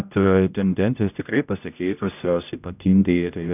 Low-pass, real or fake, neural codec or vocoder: 3.6 kHz; fake; codec, 16 kHz, 0.5 kbps, X-Codec, HuBERT features, trained on general audio